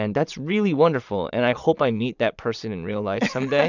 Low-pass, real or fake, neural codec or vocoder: 7.2 kHz; fake; vocoder, 22.05 kHz, 80 mel bands, Vocos